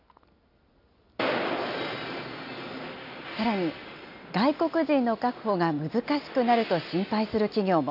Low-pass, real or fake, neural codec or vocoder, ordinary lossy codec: 5.4 kHz; real; none; AAC, 48 kbps